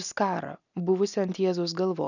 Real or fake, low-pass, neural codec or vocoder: real; 7.2 kHz; none